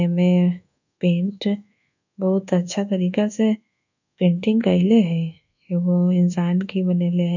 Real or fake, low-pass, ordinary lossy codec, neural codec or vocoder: fake; 7.2 kHz; none; codec, 24 kHz, 1.2 kbps, DualCodec